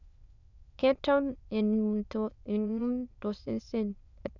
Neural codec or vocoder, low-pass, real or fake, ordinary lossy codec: autoencoder, 22.05 kHz, a latent of 192 numbers a frame, VITS, trained on many speakers; 7.2 kHz; fake; none